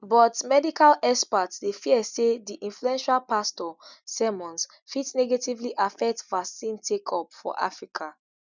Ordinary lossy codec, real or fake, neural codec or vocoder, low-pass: none; real; none; 7.2 kHz